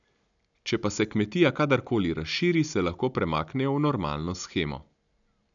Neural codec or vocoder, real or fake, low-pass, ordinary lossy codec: none; real; 7.2 kHz; none